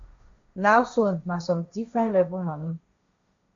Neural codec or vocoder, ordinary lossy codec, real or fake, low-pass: codec, 16 kHz, 1.1 kbps, Voila-Tokenizer; AAC, 64 kbps; fake; 7.2 kHz